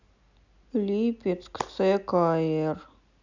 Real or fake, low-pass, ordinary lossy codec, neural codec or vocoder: real; 7.2 kHz; none; none